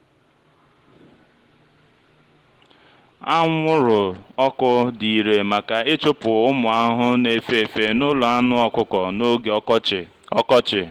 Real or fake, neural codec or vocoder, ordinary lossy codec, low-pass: real; none; Opus, 16 kbps; 19.8 kHz